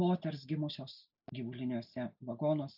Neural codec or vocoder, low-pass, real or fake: none; 5.4 kHz; real